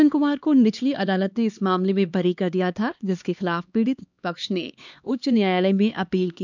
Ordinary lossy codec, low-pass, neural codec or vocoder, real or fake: none; 7.2 kHz; codec, 16 kHz, 2 kbps, X-Codec, HuBERT features, trained on LibriSpeech; fake